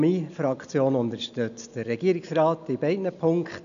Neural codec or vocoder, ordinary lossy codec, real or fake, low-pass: none; none; real; 7.2 kHz